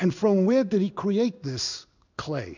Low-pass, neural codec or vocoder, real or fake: 7.2 kHz; codec, 16 kHz in and 24 kHz out, 1 kbps, XY-Tokenizer; fake